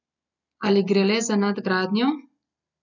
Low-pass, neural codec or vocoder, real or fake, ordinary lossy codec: 7.2 kHz; none; real; none